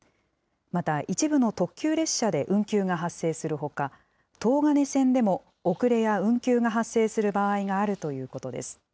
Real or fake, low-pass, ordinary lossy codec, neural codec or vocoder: real; none; none; none